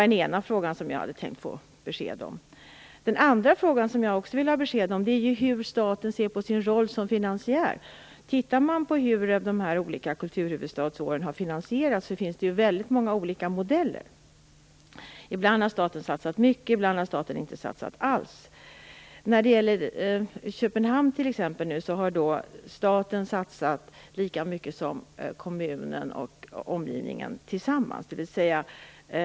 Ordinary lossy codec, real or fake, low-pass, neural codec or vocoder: none; real; none; none